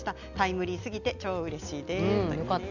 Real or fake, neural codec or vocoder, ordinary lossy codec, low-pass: real; none; none; 7.2 kHz